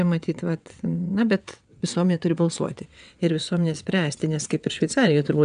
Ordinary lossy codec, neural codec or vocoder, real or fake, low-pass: MP3, 96 kbps; vocoder, 22.05 kHz, 80 mel bands, WaveNeXt; fake; 9.9 kHz